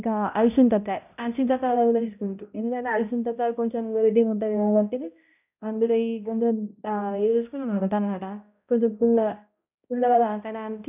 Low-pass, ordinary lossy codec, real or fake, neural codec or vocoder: 3.6 kHz; none; fake; codec, 16 kHz, 0.5 kbps, X-Codec, HuBERT features, trained on balanced general audio